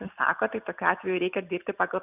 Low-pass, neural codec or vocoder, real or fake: 3.6 kHz; none; real